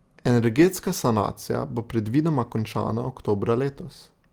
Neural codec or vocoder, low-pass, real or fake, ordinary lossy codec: none; 19.8 kHz; real; Opus, 24 kbps